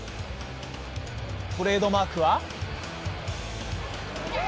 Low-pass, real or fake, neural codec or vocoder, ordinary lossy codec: none; real; none; none